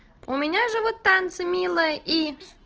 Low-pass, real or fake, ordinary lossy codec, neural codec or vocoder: 7.2 kHz; real; Opus, 16 kbps; none